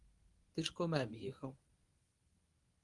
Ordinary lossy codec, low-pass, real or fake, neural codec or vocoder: Opus, 24 kbps; 10.8 kHz; fake; codec, 24 kHz, 0.9 kbps, WavTokenizer, medium speech release version 1